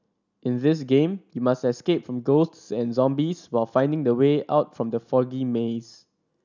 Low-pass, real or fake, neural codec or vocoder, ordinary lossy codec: 7.2 kHz; real; none; none